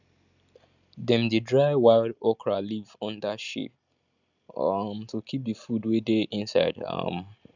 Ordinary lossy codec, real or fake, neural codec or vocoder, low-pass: none; real; none; 7.2 kHz